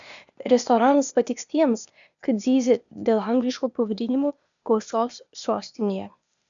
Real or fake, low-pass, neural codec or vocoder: fake; 7.2 kHz; codec, 16 kHz, 0.8 kbps, ZipCodec